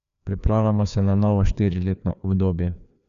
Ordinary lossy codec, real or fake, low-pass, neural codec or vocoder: none; fake; 7.2 kHz; codec, 16 kHz, 2 kbps, FreqCodec, larger model